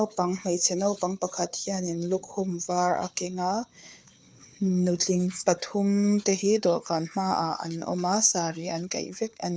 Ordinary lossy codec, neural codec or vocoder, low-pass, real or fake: none; codec, 16 kHz, 4 kbps, FunCodec, trained on Chinese and English, 50 frames a second; none; fake